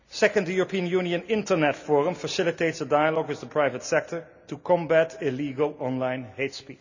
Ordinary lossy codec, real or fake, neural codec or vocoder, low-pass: none; real; none; 7.2 kHz